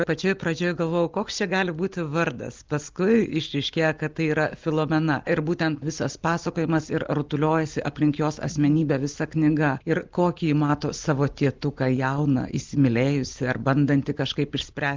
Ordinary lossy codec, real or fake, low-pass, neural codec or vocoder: Opus, 24 kbps; real; 7.2 kHz; none